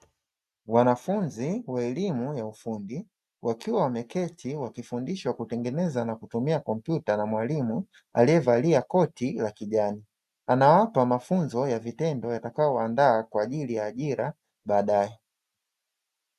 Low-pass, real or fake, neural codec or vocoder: 14.4 kHz; real; none